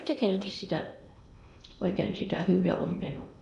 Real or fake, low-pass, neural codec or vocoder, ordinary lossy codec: fake; 10.8 kHz; codec, 16 kHz in and 24 kHz out, 0.8 kbps, FocalCodec, streaming, 65536 codes; none